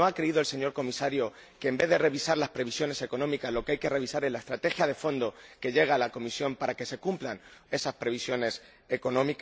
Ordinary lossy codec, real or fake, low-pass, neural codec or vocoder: none; real; none; none